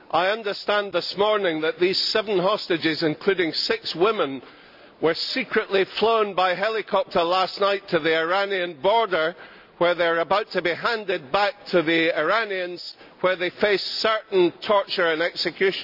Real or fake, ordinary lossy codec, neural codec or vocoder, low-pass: real; none; none; 5.4 kHz